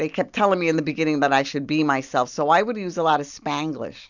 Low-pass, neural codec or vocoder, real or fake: 7.2 kHz; none; real